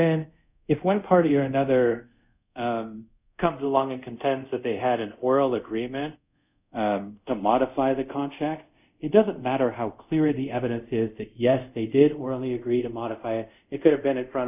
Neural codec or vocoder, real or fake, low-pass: codec, 24 kHz, 0.5 kbps, DualCodec; fake; 3.6 kHz